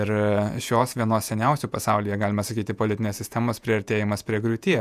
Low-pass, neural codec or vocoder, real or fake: 14.4 kHz; vocoder, 48 kHz, 128 mel bands, Vocos; fake